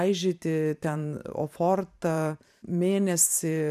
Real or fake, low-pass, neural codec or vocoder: fake; 14.4 kHz; vocoder, 44.1 kHz, 128 mel bands every 512 samples, BigVGAN v2